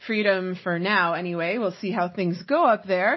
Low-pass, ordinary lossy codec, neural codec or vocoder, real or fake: 7.2 kHz; MP3, 24 kbps; none; real